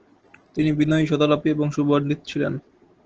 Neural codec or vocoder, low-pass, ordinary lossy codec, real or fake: none; 7.2 kHz; Opus, 16 kbps; real